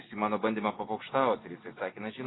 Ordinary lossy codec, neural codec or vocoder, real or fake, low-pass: AAC, 16 kbps; none; real; 7.2 kHz